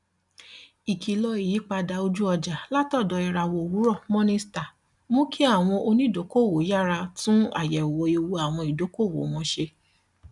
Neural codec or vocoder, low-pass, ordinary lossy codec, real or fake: none; 10.8 kHz; none; real